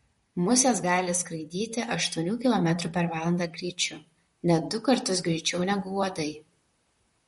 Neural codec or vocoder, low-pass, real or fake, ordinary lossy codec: vocoder, 44.1 kHz, 128 mel bands, Pupu-Vocoder; 19.8 kHz; fake; MP3, 48 kbps